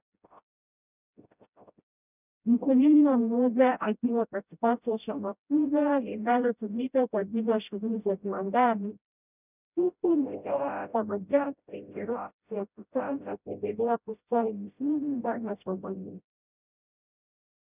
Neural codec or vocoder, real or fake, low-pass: codec, 16 kHz, 0.5 kbps, FreqCodec, smaller model; fake; 3.6 kHz